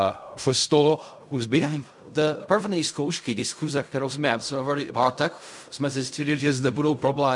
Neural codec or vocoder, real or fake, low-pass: codec, 16 kHz in and 24 kHz out, 0.4 kbps, LongCat-Audio-Codec, fine tuned four codebook decoder; fake; 10.8 kHz